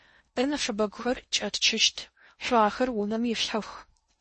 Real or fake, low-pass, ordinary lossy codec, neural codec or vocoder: fake; 10.8 kHz; MP3, 32 kbps; codec, 16 kHz in and 24 kHz out, 0.6 kbps, FocalCodec, streaming, 2048 codes